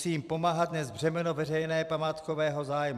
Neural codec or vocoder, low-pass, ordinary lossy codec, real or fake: vocoder, 44.1 kHz, 128 mel bands every 256 samples, BigVGAN v2; 14.4 kHz; AAC, 96 kbps; fake